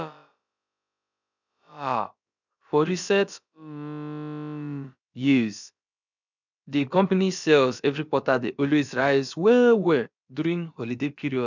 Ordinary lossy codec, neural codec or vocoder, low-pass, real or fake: none; codec, 16 kHz, about 1 kbps, DyCAST, with the encoder's durations; 7.2 kHz; fake